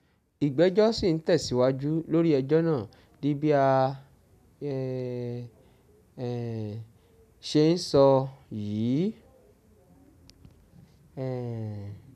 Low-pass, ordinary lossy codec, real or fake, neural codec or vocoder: 14.4 kHz; none; real; none